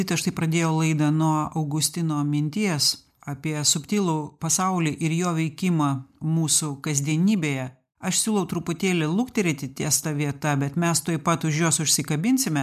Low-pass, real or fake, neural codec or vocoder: 14.4 kHz; real; none